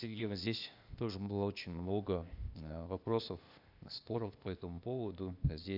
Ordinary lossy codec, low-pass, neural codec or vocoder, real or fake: none; 5.4 kHz; codec, 16 kHz, 0.8 kbps, ZipCodec; fake